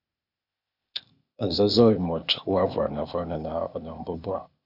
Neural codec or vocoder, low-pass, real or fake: codec, 16 kHz, 0.8 kbps, ZipCodec; 5.4 kHz; fake